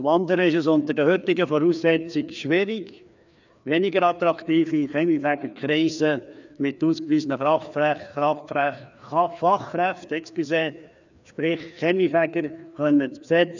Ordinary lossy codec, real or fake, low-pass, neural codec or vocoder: none; fake; 7.2 kHz; codec, 16 kHz, 2 kbps, FreqCodec, larger model